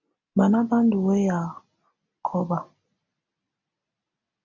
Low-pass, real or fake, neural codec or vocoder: 7.2 kHz; real; none